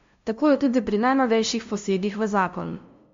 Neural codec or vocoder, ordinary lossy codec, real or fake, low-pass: codec, 16 kHz, 0.5 kbps, FunCodec, trained on LibriTTS, 25 frames a second; MP3, 48 kbps; fake; 7.2 kHz